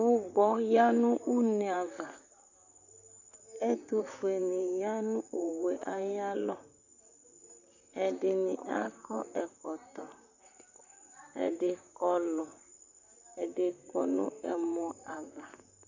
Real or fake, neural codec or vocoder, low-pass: fake; vocoder, 44.1 kHz, 128 mel bands, Pupu-Vocoder; 7.2 kHz